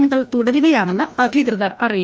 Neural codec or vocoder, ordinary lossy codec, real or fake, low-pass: codec, 16 kHz, 1 kbps, FreqCodec, larger model; none; fake; none